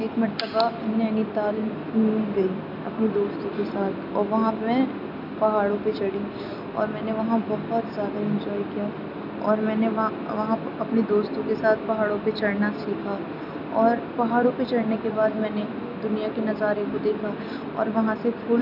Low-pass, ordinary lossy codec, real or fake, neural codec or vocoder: 5.4 kHz; none; real; none